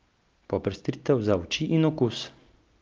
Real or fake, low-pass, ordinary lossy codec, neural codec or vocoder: real; 7.2 kHz; Opus, 24 kbps; none